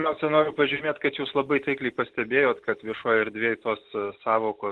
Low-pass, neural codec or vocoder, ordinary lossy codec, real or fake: 10.8 kHz; none; Opus, 16 kbps; real